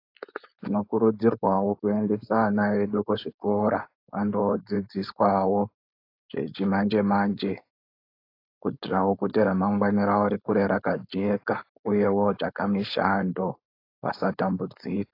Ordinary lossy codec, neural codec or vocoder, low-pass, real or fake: AAC, 32 kbps; codec, 16 kHz, 4.8 kbps, FACodec; 5.4 kHz; fake